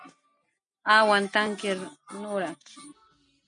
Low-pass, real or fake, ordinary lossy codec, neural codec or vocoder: 9.9 kHz; real; MP3, 96 kbps; none